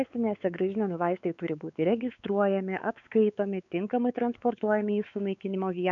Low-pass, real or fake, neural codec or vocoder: 7.2 kHz; fake; codec, 16 kHz, 4 kbps, X-Codec, WavLM features, trained on Multilingual LibriSpeech